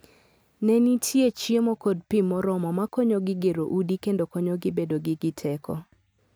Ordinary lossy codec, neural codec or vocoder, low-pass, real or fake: none; none; none; real